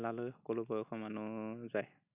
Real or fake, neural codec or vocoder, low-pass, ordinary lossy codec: real; none; 3.6 kHz; none